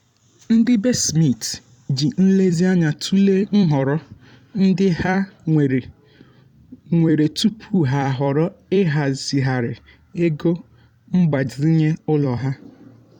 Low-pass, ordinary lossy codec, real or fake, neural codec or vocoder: 19.8 kHz; none; fake; vocoder, 44.1 kHz, 128 mel bands every 256 samples, BigVGAN v2